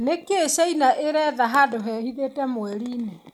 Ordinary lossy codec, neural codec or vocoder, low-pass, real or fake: none; none; 19.8 kHz; real